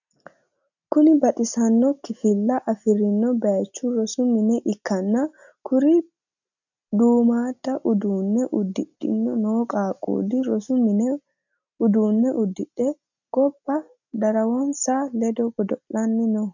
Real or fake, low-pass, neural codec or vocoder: real; 7.2 kHz; none